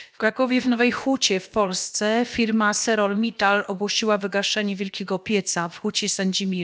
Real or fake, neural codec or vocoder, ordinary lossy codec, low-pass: fake; codec, 16 kHz, about 1 kbps, DyCAST, with the encoder's durations; none; none